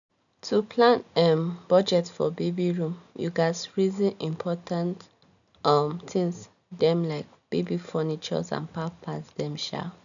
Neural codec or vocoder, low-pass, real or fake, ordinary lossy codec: none; 7.2 kHz; real; none